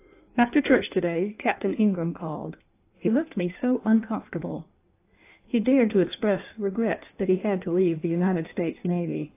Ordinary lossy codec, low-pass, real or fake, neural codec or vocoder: AAC, 24 kbps; 3.6 kHz; fake; codec, 16 kHz in and 24 kHz out, 1.1 kbps, FireRedTTS-2 codec